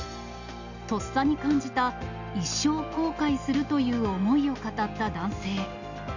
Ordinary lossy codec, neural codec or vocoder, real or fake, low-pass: none; none; real; 7.2 kHz